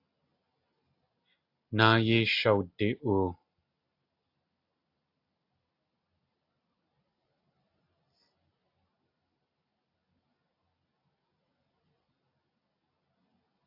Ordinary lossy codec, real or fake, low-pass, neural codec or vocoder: AAC, 32 kbps; real; 5.4 kHz; none